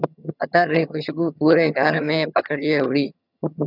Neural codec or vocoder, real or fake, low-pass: vocoder, 22.05 kHz, 80 mel bands, HiFi-GAN; fake; 5.4 kHz